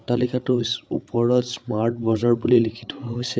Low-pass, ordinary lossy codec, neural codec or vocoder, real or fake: none; none; codec, 16 kHz, 8 kbps, FreqCodec, larger model; fake